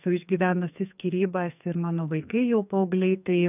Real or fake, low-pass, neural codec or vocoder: fake; 3.6 kHz; codec, 44.1 kHz, 2.6 kbps, SNAC